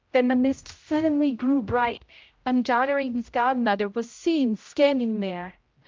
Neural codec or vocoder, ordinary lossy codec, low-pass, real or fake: codec, 16 kHz, 0.5 kbps, X-Codec, HuBERT features, trained on general audio; Opus, 24 kbps; 7.2 kHz; fake